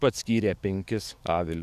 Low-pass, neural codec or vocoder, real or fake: 14.4 kHz; codec, 44.1 kHz, 7.8 kbps, DAC; fake